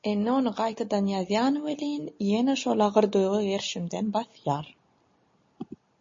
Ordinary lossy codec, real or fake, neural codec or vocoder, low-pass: MP3, 32 kbps; real; none; 7.2 kHz